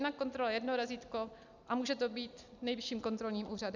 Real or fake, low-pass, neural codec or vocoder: real; 7.2 kHz; none